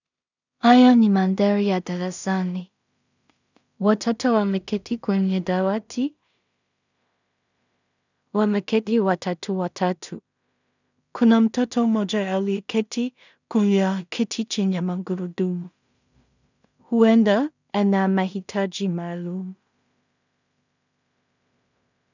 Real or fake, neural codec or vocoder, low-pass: fake; codec, 16 kHz in and 24 kHz out, 0.4 kbps, LongCat-Audio-Codec, two codebook decoder; 7.2 kHz